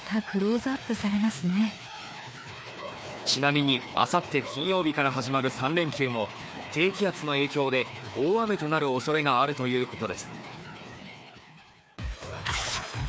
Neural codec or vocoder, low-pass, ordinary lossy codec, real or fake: codec, 16 kHz, 2 kbps, FreqCodec, larger model; none; none; fake